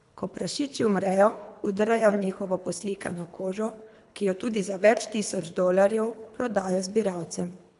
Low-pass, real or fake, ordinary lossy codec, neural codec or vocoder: 10.8 kHz; fake; none; codec, 24 kHz, 3 kbps, HILCodec